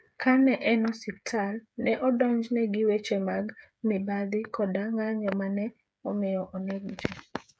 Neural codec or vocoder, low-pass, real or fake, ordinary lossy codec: codec, 16 kHz, 8 kbps, FreqCodec, smaller model; none; fake; none